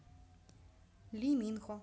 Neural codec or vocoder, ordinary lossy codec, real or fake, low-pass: none; none; real; none